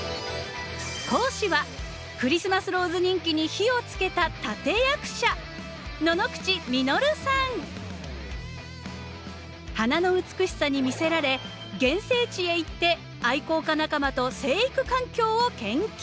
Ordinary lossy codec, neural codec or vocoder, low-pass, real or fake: none; none; none; real